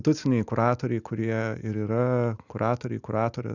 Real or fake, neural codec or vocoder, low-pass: real; none; 7.2 kHz